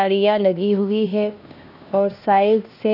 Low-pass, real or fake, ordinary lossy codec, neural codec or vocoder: 5.4 kHz; fake; none; codec, 16 kHz, 1 kbps, X-Codec, HuBERT features, trained on LibriSpeech